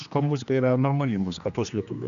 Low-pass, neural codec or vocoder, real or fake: 7.2 kHz; codec, 16 kHz, 2 kbps, X-Codec, HuBERT features, trained on general audio; fake